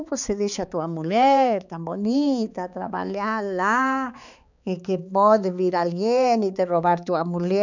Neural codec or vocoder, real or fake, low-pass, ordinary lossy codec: codec, 16 kHz, 4 kbps, X-Codec, HuBERT features, trained on balanced general audio; fake; 7.2 kHz; none